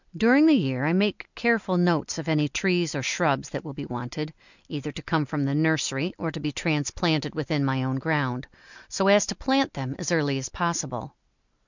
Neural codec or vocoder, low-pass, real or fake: none; 7.2 kHz; real